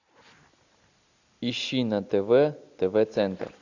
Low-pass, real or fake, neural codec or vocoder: 7.2 kHz; real; none